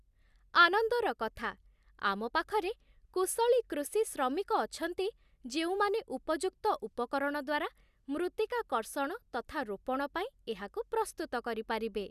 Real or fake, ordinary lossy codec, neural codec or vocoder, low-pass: real; Opus, 64 kbps; none; 14.4 kHz